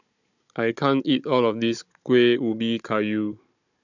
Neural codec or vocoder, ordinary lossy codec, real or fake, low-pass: codec, 16 kHz, 16 kbps, FunCodec, trained on Chinese and English, 50 frames a second; none; fake; 7.2 kHz